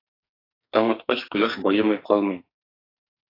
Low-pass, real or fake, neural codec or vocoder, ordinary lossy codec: 5.4 kHz; fake; codec, 44.1 kHz, 2.6 kbps, SNAC; AAC, 24 kbps